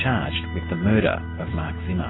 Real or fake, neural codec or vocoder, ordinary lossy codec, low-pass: real; none; AAC, 16 kbps; 7.2 kHz